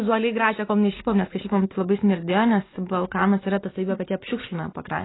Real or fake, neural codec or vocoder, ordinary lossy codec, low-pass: real; none; AAC, 16 kbps; 7.2 kHz